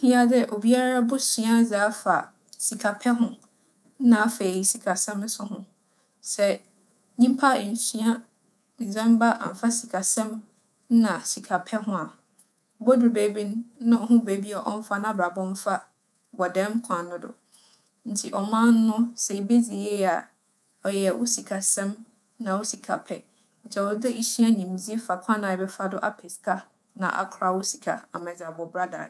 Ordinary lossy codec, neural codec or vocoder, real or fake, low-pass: none; codec, 24 kHz, 3.1 kbps, DualCodec; fake; none